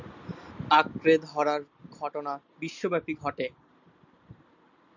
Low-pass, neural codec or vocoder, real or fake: 7.2 kHz; none; real